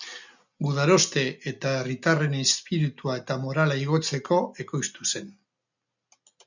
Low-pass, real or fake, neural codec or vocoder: 7.2 kHz; real; none